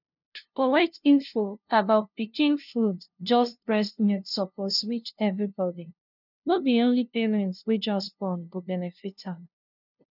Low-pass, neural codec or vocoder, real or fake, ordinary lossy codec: 5.4 kHz; codec, 16 kHz, 0.5 kbps, FunCodec, trained on LibriTTS, 25 frames a second; fake; none